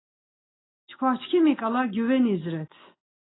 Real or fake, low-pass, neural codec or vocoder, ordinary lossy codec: real; 7.2 kHz; none; AAC, 16 kbps